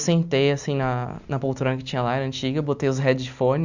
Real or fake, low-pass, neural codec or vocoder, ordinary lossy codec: real; 7.2 kHz; none; none